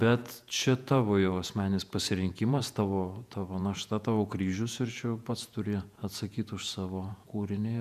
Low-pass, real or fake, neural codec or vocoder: 14.4 kHz; fake; vocoder, 48 kHz, 128 mel bands, Vocos